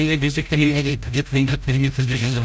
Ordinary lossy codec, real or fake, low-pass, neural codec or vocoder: none; fake; none; codec, 16 kHz, 0.5 kbps, FreqCodec, larger model